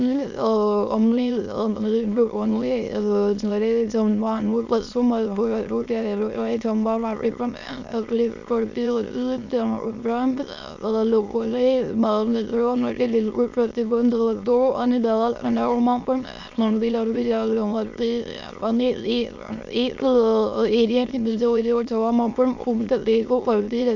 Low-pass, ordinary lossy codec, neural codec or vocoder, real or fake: 7.2 kHz; none; autoencoder, 22.05 kHz, a latent of 192 numbers a frame, VITS, trained on many speakers; fake